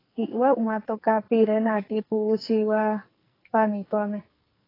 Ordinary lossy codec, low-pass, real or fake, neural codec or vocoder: AAC, 24 kbps; 5.4 kHz; fake; codec, 44.1 kHz, 2.6 kbps, SNAC